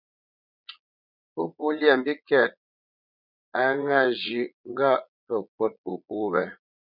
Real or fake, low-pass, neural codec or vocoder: fake; 5.4 kHz; vocoder, 22.05 kHz, 80 mel bands, Vocos